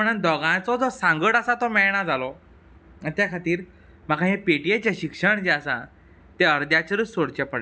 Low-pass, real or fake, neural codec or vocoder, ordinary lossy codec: none; real; none; none